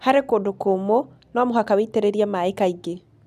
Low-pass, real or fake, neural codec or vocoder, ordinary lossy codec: 14.4 kHz; real; none; none